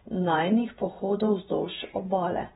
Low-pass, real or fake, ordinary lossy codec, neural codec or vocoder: 19.8 kHz; fake; AAC, 16 kbps; vocoder, 48 kHz, 128 mel bands, Vocos